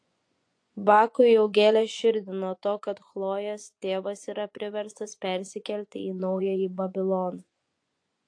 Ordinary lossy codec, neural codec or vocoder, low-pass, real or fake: AAC, 48 kbps; vocoder, 24 kHz, 100 mel bands, Vocos; 9.9 kHz; fake